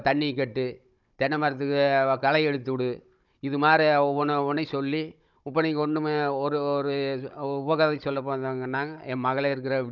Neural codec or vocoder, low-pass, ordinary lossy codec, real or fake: codec, 16 kHz, 16 kbps, FunCodec, trained on Chinese and English, 50 frames a second; 7.2 kHz; none; fake